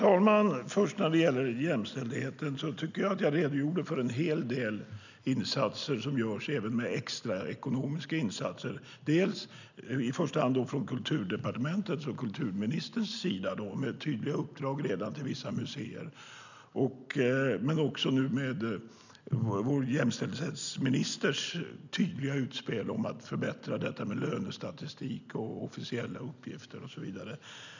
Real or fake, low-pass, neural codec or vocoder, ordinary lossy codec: real; 7.2 kHz; none; none